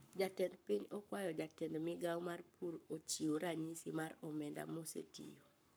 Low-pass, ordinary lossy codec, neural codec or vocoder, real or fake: none; none; codec, 44.1 kHz, 7.8 kbps, Pupu-Codec; fake